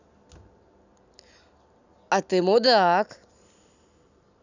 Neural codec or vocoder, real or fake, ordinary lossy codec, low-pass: none; real; none; 7.2 kHz